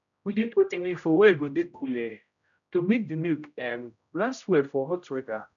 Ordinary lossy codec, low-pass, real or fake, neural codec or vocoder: none; 7.2 kHz; fake; codec, 16 kHz, 0.5 kbps, X-Codec, HuBERT features, trained on general audio